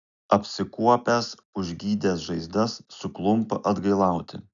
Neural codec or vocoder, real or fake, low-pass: none; real; 7.2 kHz